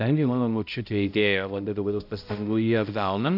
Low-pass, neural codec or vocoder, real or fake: 5.4 kHz; codec, 16 kHz, 0.5 kbps, X-Codec, HuBERT features, trained on balanced general audio; fake